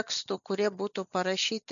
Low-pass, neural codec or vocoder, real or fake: 7.2 kHz; none; real